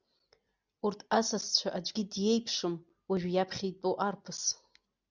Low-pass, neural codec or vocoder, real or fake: 7.2 kHz; none; real